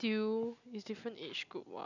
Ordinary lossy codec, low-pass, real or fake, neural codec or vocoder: none; 7.2 kHz; real; none